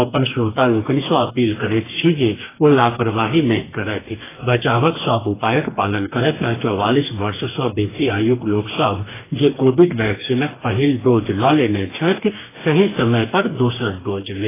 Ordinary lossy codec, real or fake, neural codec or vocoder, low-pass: AAC, 16 kbps; fake; codec, 44.1 kHz, 2.6 kbps, DAC; 3.6 kHz